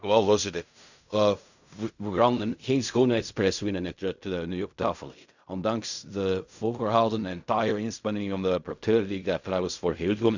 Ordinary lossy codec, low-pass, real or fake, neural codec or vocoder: none; 7.2 kHz; fake; codec, 16 kHz in and 24 kHz out, 0.4 kbps, LongCat-Audio-Codec, fine tuned four codebook decoder